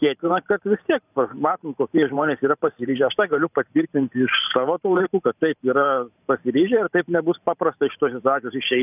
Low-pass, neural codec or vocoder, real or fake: 3.6 kHz; vocoder, 44.1 kHz, 128 mel bands every 512 samples, BigVGAN v2; fake